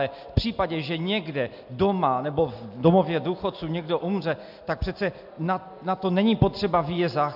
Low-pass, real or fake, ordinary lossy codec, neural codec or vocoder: 5.4 kHz; real; AAC, 48 kbps; none